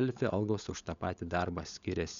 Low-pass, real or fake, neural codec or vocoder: 7.2 kHz; fake; codec, 16 kHz, 16 kbps, FunCodec, trained on LibriTTS, 50 frames a second